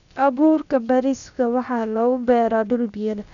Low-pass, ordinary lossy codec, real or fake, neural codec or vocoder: 7.2 kHz; none; fake; codec, 16 kHz, about 1 kbps, DyCAST, with the encoder's durations